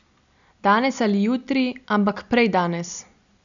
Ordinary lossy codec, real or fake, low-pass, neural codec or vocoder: none; real; 7.2 kHz; none